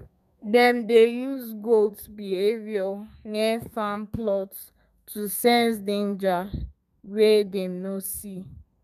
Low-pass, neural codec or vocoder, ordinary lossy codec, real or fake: 14.4 kHz; codec, 32 kHz, 1.9 kbps, SNAC; none; fake